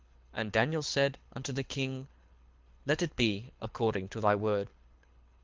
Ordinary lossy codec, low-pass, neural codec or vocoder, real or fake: Opus, 32 kbps; 7.2 kHz; codec, 24 kHz, 6 kbps, HILCodec; fake